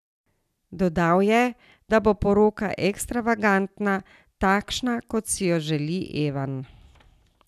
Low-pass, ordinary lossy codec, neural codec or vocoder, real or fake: 14.4 kHz; none; none; real